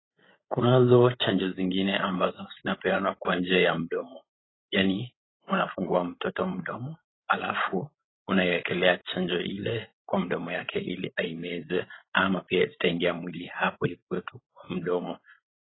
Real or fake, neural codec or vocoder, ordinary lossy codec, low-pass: fake; codec, 16 kHz, 16 kbps, FreqCodec, larger model; AAC, 16 kbps; 7.2 kHz